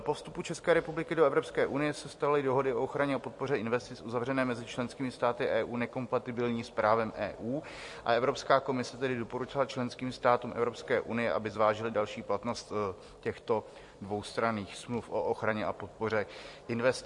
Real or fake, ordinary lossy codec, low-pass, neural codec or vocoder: fake; MP3, 48 kbps; 10.8 kHz; vocoder, 44.1 kHz, 128 mel bands every 256 samples, BigVGAN v2